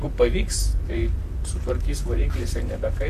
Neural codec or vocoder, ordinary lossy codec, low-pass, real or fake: vocoder, 44.1 kHz, 128 mel bands, Pupu-Vocoder; AAC, 96 kbps; 14.4 kHz; fake